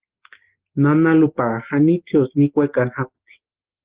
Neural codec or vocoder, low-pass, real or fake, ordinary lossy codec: none; 3.6 kHz; real; Opus, 16 kbps